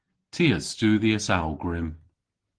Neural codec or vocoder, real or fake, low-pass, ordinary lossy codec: none; real; 9.9 kHz; Opus, 16 kbps